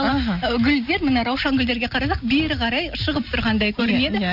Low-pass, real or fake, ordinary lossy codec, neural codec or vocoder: 5.4 kHz; real; none; none